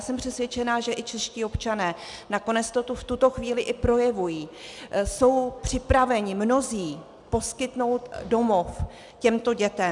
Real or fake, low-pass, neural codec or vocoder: real; 10.8 kHz; none